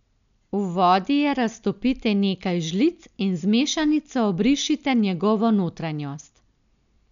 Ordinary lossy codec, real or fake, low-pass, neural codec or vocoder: none; real; 7.2 kHz; none